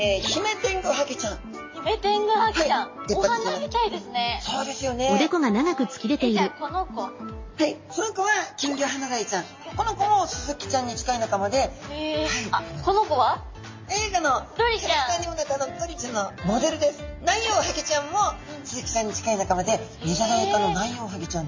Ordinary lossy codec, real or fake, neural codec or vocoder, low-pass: MP3, 32 kbps; real; none; 7.2 kHz